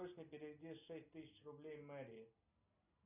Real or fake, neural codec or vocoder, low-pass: real; none; 3.6 kHz